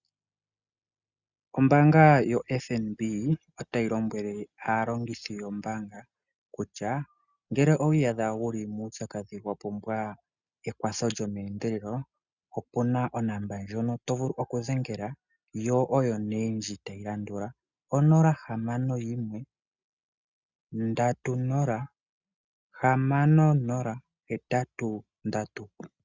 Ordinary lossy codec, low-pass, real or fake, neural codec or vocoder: Opus, 64 kbps; 7.2 kHz; real; none